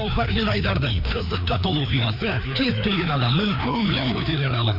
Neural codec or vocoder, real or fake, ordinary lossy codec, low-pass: codec, 16 kHz, 2 kbps, FreqCodec, larger model; fake; none; 5.4 kHz